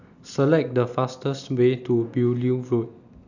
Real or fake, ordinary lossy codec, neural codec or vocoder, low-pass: real; none; none; 7.2 kHz